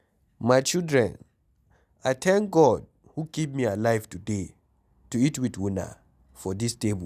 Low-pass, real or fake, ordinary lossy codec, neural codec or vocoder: 14.4 kHz; real; none; none